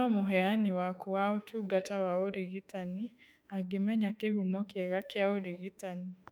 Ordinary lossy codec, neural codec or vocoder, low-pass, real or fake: none; autoencoder, 48 kHz, 32 numbers a frame, DAC-VAE, trained on Japanese speech; 19.8 kHz; fake